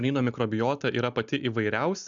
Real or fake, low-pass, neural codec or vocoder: real; 7.2 kHz; none